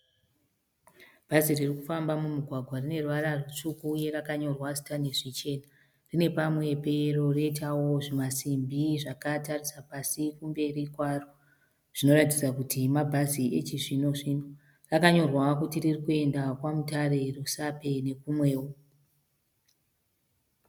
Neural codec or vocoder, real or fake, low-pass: none; real; 19.8 kHz